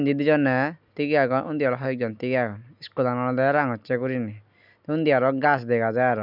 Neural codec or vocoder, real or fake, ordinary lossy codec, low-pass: none; real; none; 5.4 kHz